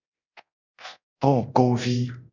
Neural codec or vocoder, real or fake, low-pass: codec, 24 kHz, 0.9 kbps, DualCodec; fake; 7.2 kHz